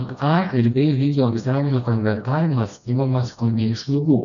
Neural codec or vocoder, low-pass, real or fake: codec, 16 kHz, 1 kbps, FreqCodec, smaller model; 7.2 kHz; fake